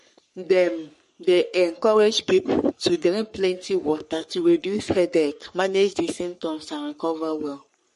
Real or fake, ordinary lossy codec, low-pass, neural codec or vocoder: fake; MP3, 48 kbps; 14.4 kHz; codec, 44.1 kHz, 3.4 kbps, Pupu-Codec